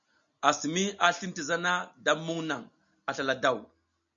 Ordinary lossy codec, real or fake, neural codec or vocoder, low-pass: MP3, 96 kbps; real; none; 7.2 kHz